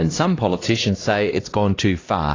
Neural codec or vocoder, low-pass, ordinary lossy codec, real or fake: codec, 16 kHz, 1 kbps, X-Codec, HuBERT features, trained on LibriSpeech; 7.2 kHz; AAC, 32 kbps; fake